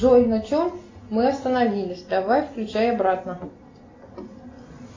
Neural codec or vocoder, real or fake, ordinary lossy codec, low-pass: none; real; AAC, 32 kbps; 7.2 kHz